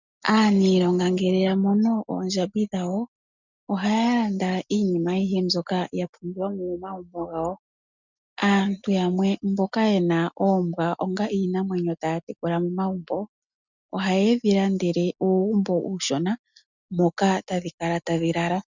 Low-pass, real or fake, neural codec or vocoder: 7.2 kHz; real; none